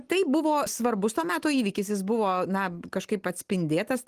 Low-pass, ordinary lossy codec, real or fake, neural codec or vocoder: 14.4 kHz; Opus, 24 kbps; real; none